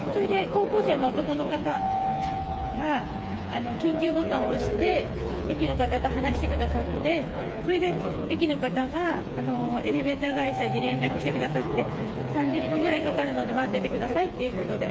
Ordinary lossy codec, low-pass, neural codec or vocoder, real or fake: none; none; codec, 16 kHz, 4 kbps, FreqCodec, smaller model; fake